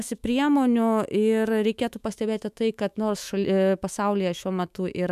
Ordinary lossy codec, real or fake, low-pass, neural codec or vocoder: MP3, 96 kbps; fake; 14.4 kHz; autoencoder, 48 kHz, 128 numbers a frame, DAC-VAE, trained on Japanese speech